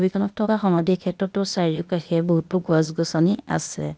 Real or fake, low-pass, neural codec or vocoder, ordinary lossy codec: fake; none; codec, 16 kHz, 0.8 kbps, ZipCodec; none